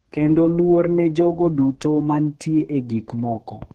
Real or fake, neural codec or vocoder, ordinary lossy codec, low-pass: fake; codec, 32 kHz, 1.9 kbps, SNAC; Opus, 16 kbps; 14.4 kHz